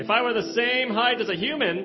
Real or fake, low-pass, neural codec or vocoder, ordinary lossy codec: real; 7.2 kHz; none; MP3, 24 kbps